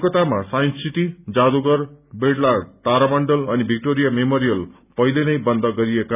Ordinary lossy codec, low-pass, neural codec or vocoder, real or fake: none; 3.6 kHz; none; real